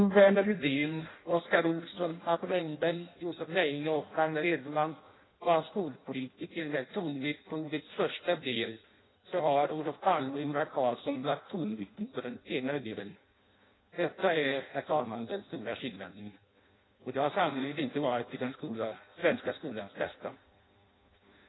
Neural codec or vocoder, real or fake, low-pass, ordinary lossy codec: codec, 16 kHz in and 24 kHz out, 0.6 kbps, FireRedTTS-2 codec; fake; 7.2 kHz; AAC, 16 kbps